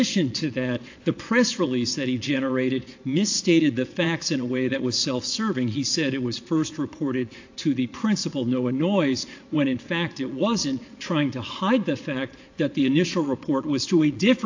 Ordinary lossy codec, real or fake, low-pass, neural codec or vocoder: AAC, 48 kbps; fake; 7.2 kHz; vocoder, 22.05 kHz, 80 mel bands, Vocos